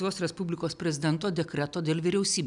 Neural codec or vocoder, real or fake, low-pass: none; real; 10.8 kHz